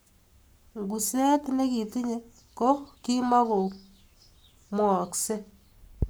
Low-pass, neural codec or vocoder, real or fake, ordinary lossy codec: none; codec, 44.1 kHz, 7.8 kbps, Pupu-Codec; fake; none